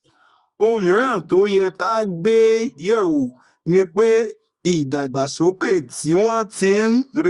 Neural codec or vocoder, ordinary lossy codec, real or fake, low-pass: codec, 24 kHz, 0.9 kbps, WavTokenizer, medium music audio release; Opus, 64 kbps; fake; 10.8 kHz